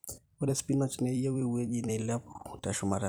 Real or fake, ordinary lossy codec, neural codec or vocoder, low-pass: fake; none; vocoder, 44.1 kHz, 128 mel bands every 256 samples, BigVGAN v2; none